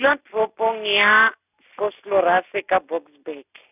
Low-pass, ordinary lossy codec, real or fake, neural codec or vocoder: 3.6 kHz; none; real; none